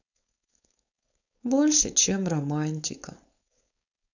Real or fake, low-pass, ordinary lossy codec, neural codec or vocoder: fake; 7.2 kHz; none; codec, 16 kHz, 4.8 kbps, FACodec